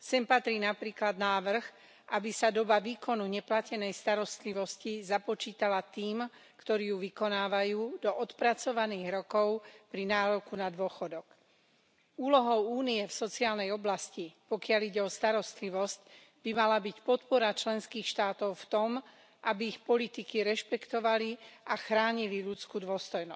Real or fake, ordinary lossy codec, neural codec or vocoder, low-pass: real; none; none; none